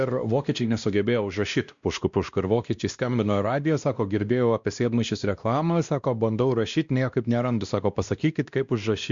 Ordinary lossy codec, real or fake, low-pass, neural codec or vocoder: Opus, 64 kbps; fake; 7.2 kHz; codec, 16 kHz, 1 kbps, X-Codec, WavLM features, trained on Multilingual LibriSpeech